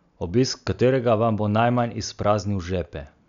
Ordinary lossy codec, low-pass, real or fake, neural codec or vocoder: MP3, 96 kbps; 7.2 kHz; real; none